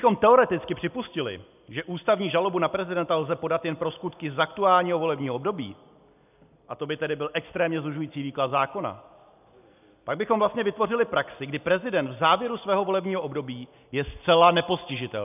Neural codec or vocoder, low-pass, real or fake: none; 3.6 kHz; real